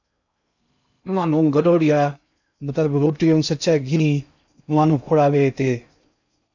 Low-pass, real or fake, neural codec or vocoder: 7.2 kHz; fake; codec, 16 kHz in and 24 kHz out, 0.6 kbps, FocalCodec, streaming, 2048 codes